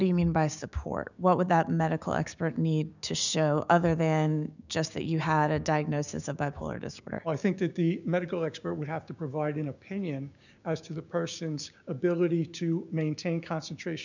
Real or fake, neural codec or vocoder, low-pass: fake; codec, 16 kHz, 6 kbps, DAC; 7.2 kHz